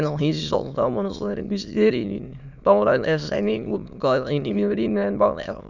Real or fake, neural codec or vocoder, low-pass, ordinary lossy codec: fake; autoencoder, 22.05 kHz, a latent of 192 numbers a frame, VITS, trained on many speakers; 7.2 kHz; none